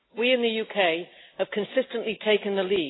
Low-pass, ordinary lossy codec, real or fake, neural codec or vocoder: 7.2 kHz; AAC, 16 kbps; real; none